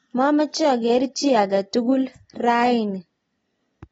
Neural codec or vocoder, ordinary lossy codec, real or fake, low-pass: none; AAC, 24 kbps; real; 10.8 kHz